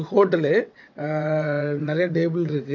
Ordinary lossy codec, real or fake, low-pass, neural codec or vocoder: none; fake; 7.2 kHz; vocoder, 22.05 kHz, 80 mel bands, WaveNeXt